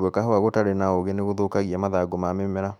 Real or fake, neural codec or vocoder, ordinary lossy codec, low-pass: fake; autoencoder, 48 kHz, 128 numbers a frame, DAC-VAE, trained on Japanese speech; none; 19.8 kHz